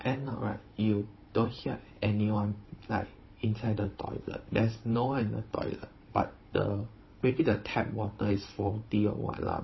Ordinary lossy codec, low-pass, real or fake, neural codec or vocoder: MP3, 24 kbps; 7.2 kHz; fake; codec, 16 kHz, 16 kbps, FunCodec, trained on Chinese and English, 50 frames a second